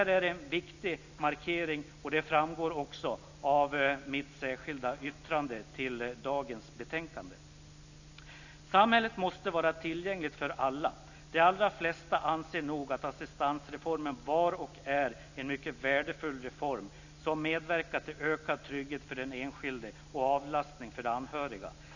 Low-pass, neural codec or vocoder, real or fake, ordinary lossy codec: 7.2 kHz; none; real; none